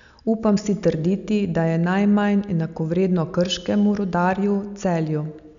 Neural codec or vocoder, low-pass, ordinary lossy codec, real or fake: none; 7.2 kHz; none; real